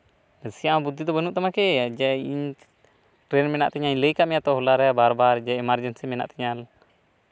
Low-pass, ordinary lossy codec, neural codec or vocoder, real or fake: none; none; none; real